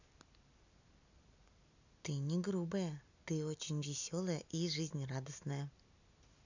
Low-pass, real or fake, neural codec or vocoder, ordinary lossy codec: 7.2 kHz; real; none; none